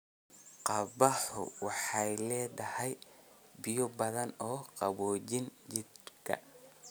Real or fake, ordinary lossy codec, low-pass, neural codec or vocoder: real; none; none; none